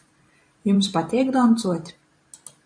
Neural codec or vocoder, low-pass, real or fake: vocoder, 44.1 kHz, 128 mel bands every 512 samples, BigVGAN v2; 9.9 kHz; fake